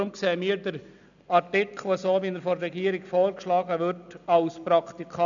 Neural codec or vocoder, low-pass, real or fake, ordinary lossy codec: none; 7.2 kHz; real; none